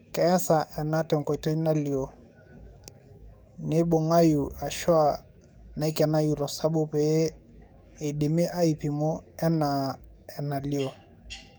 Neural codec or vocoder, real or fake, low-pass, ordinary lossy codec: codec, 44.1 kHz, 7.8 kbps, DAC; fake; none; none